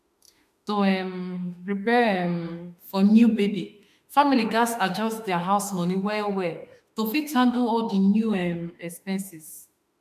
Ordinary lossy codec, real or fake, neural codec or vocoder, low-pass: MP3, 96 kbps; fake; autoencoder, 48 kHz, 32 numbers a frame, DAC-VAE, trained on Japanese speech; 14.4 kHz